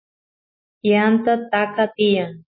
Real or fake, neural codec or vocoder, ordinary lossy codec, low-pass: real; none; MP3, 24 kbps; 5.4 kHz